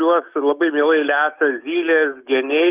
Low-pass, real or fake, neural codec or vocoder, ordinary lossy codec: 3.6 kHz; fake; autoencoder, 48 kHz, 128 numbers a frame, DAC-VAE, trained on Japanese speech; Opus, 24 kbps